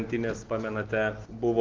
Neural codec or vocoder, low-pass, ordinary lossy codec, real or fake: none; 7.2 kHz; Opus, 16 kbps; real